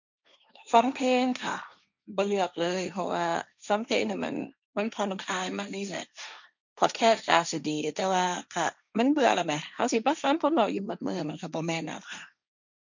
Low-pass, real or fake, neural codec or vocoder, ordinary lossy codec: 7.2 kHz; fake; codec, 16 kHz, 1.1 kbps, Voila-Tokenizer; none